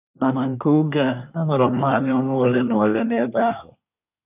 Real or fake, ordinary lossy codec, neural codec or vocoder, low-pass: fake; none; codec, 24 kHz, 1 kbps, SNAC; 3.6 kHz